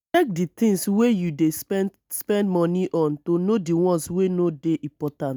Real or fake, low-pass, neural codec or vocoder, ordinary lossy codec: real; none; none; none